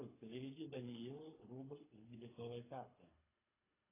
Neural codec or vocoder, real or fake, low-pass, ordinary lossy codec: codec, 24 kHz, 3 kbps, HILCodec; fake; 3.6 kHz; AAC, 16 kbps